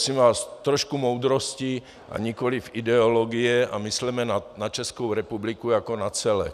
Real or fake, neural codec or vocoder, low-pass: real; none; 14.4 kHz